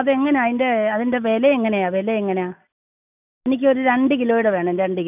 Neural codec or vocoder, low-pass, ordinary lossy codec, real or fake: none; 3.6 kHz; none; real